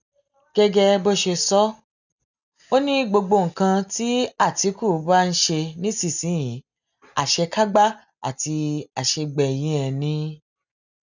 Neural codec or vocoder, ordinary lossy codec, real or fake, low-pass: none; none; real; 7.2 kHz